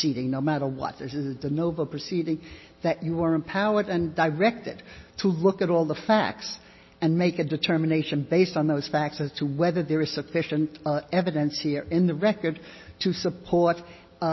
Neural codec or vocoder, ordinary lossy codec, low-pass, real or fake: none; MP3, 24 kbps; 7.2 kHz; real